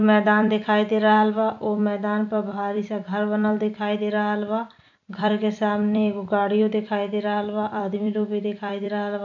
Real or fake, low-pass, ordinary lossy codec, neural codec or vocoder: fake; 7.2 kHz; none; vocoder, 44.1 kHz, 128 mel bands every 256 samples, BigVGAN v2